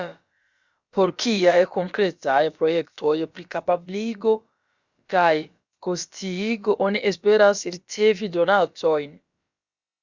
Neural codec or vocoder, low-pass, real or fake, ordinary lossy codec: codec, 16 kHz, about 1 kbps, DyCAST, with the encoder's durations; 7.2 kHz; fake; Opus, 64 kbps